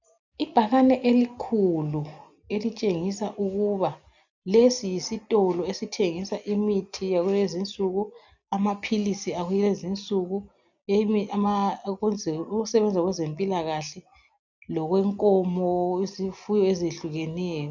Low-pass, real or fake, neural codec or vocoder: 7.2 kHz; real; none